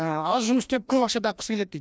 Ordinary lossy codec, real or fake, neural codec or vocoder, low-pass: none; fake; codec, 16 kHz, 1 kbps, FreqCodec, larger model; none